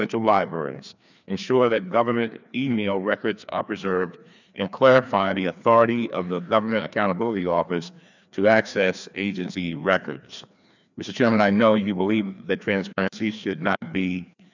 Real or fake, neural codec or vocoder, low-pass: fake; codec, 16 kHz, 2 kbps, FreqCodec, larger model; 7.2 kHz